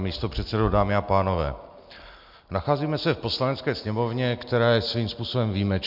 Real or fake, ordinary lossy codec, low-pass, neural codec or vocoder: real; MP3, 48 kbps; 5.4 kHz; none